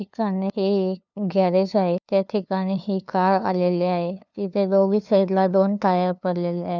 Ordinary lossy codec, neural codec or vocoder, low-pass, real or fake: none; codec, 16 kHz, 2 kbps, FunCodec, trained on LibriTTS, 25 frames a second; 7.2 kHz; fake